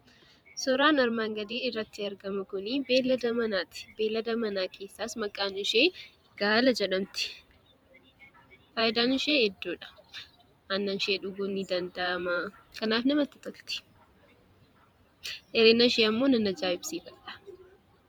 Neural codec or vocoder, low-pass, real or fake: vocoder, 44.1 kHz, 128 mel bands every 512 samples, BigVGAN v2; 19.8 kHz; fake